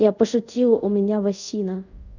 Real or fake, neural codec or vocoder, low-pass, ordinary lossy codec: fake; codec, 24 kHz, 0.5 kbps, DualCodec; 7.2 kHz; none